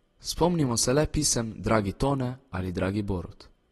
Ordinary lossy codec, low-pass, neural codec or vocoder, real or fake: AAC, 32 kbps; 19.8 kHz; none; real